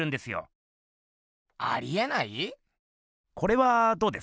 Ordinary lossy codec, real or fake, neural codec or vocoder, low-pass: none; real; none; none